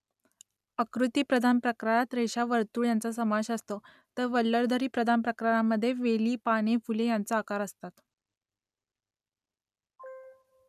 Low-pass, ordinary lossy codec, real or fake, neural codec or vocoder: 14.4 kHz; none; real; none